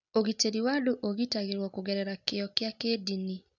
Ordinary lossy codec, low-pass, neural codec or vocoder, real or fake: none; 7.2 kHz; none; real